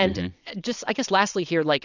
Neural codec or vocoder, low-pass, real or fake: vocoder, 22.05 kHz, 80 mel bands, WaveNeXt; 7.2 kHz; fake